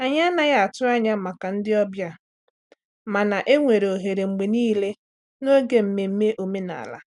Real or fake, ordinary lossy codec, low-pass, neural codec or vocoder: real; none; 10.8 kHz; none